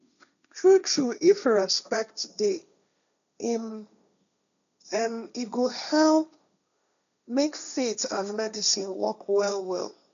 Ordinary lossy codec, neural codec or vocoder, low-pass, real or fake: none; codec, 16 kHz, 1.1 kbps, Voila-Tokenizer; 7.2 kHz; fake